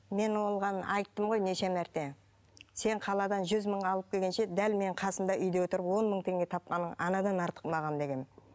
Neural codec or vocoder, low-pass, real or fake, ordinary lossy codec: none; none; real; none